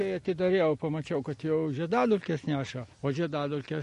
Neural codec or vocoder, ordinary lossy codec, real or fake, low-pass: none; MP3, 48 kbps; real; 10.8 kHz